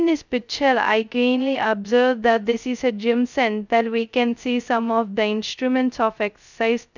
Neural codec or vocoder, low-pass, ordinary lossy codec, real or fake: codec, 16 kHz, 0.2 kbps, FocalCodec; 7.2 kHz; none; fake